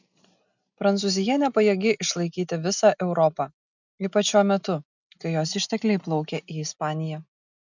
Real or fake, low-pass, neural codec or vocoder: real; 7.2 kHz; none